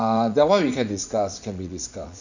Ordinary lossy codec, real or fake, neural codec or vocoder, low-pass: none; fake; vocoder, 44.1 kHz, 80 mel bands, Vocos; 7.2 kHz